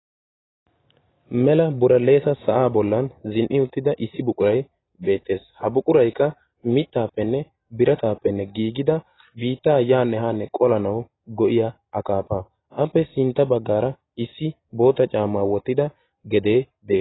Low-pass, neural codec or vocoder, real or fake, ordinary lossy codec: 7.2 kHz; none; real; AAC, 16 kbps